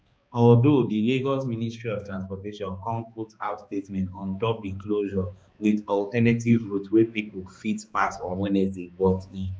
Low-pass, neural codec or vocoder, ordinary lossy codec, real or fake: none; codec, 16 kHz, 2 kbps, X-Codec, HuBERT features, trained on balanced general audio; none; fake